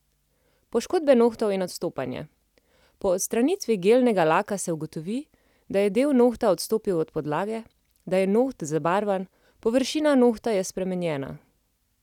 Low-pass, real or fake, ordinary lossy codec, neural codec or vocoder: 19.8 kHz; real; none; none